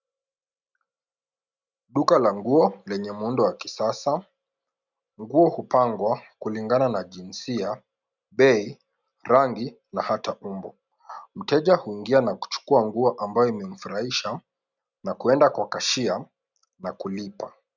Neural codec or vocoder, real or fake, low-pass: none; real; 7.2 kHz